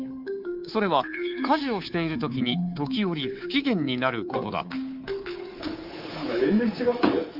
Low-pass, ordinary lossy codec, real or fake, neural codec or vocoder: 5.4 kHz; Opus, 24 kbps; fake; codec, 24 kHz, 3.1 kbps, DualCodec